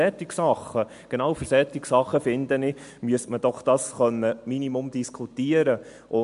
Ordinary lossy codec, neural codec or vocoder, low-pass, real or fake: MP3, 64 kbps; none; 10.8 kHz; real